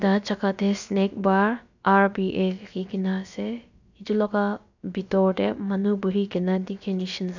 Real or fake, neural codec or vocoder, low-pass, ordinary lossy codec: fake; codec, 16 kHz, about 1 kbps, DyCAST, with the encoder's durations; 7.2 kHz; none